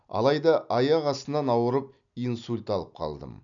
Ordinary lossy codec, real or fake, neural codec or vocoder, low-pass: none; real; none; 7.2 kHz